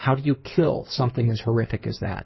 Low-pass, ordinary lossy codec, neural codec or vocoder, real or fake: 7.2 kHz; MP3, 24 kbps; codec, 16 kHz in and 24 kHz out, 2.2 kbps, FireRedTTS-2 codec; fake